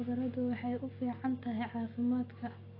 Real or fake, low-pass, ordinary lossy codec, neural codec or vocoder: real; 5.4 kHz; AAC, 24 kbps; none